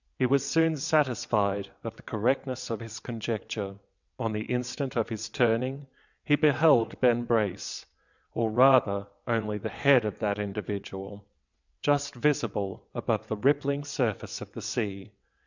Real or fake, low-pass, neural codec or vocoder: fake; 7.2 kHz; vocoder, 22.05 kHz, 80 mel bands, WaveNeXt